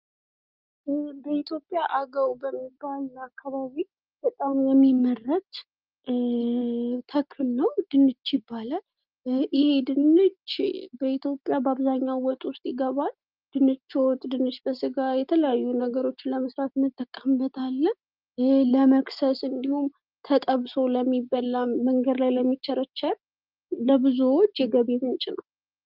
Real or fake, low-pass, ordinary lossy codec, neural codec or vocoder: real; 5.4 kHz; Opus, 32 kbps; none